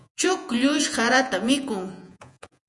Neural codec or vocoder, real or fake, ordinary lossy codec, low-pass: vocoder, 48 kHz, 128 mel bands, Vocos; fake; MP3, 96 kbps; 10.8 kHz